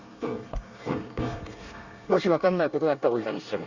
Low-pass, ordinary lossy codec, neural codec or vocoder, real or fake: 7.2 kHz; Opus, 64 kbps; codec, 24 kHz, 1 kbps, SNAC; fake